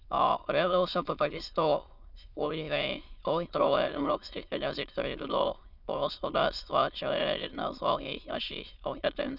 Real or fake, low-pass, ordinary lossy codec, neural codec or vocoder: fake; 5.4 kHz; none; autoencoder, 22.05 kHz, a latent of 192 numbers a frame, VITS, trained on many speakers